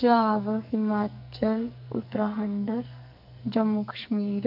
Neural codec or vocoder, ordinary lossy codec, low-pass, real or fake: codec, 44.1 kHz, 2.6 kbps, SNAC; none; 5.4 kHz; fake